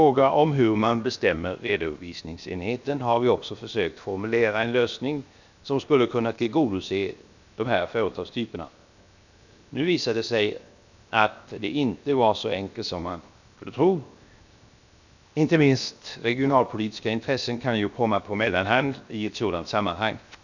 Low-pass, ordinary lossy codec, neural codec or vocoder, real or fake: 7.2 kHz; none; codec, 16 kHz, 0.7 kbps, FocalCodec; fake